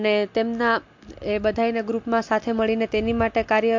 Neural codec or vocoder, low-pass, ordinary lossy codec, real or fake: none; 7.2 kHz; MP3, 48 kbps; real